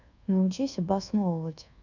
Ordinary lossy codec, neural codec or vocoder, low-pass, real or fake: none; codec, 24 kHz, 1.2 kbps, DualCodec; 7.2 kHz; fake